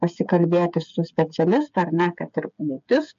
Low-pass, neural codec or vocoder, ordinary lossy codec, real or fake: 7.2 kHz; codec, 16 kHz, 8 kbps, FreqCodec, smaller model; MP3, 48 kbps; fake